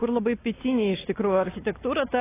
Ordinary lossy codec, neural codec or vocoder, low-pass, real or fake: AAC, 16 kbps; none; 3.6 kHz; real